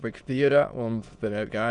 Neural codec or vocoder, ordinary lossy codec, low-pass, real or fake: autoencoder, 22.05 kHz, a latent of 192 numbers a frame, VITS, trained on many speakers; Opus, 64 kbps; 9.9 kHz; fake